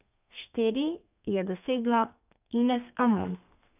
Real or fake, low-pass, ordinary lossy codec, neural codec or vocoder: fake; 3.6 kHz; none; codec, 32 kHz, 1.9 kbps, SNAC